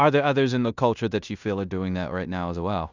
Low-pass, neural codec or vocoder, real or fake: 7.2 kHz; codec, 16 kHz in and 24 kHz out, 0.9 kbps, LongCat-Audio-Codec, fine tuned four codebook decoder; fake